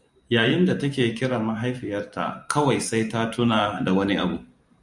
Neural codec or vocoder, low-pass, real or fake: vocoder, 44.1 kHz, 128 mel bands every 512 samples, BigVGAN v2; 10.8 kHz; fake